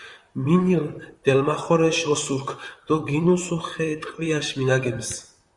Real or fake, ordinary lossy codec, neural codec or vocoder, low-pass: fake; Opus, 64 kbps; vocoder, 44.1 kHz, 128 mel bands, Pupu-Vocoder; 10.8 kHz